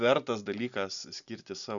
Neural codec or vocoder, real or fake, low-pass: none; real; 7.2 kHz